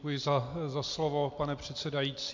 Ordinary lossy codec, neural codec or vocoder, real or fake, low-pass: MP3, 48 kbps; none; real; 7.2 kHz